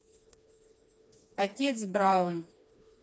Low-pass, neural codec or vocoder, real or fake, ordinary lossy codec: none; codec, 16 kHz, 2 kbps, FreqCodec, smaller model; fake; none